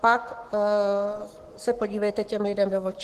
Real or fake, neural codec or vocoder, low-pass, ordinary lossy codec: fake; codec, 44.1 kHz, 7.8 kbps, Pupu-Codec; 14.4 kHz; Opus, 16 kbps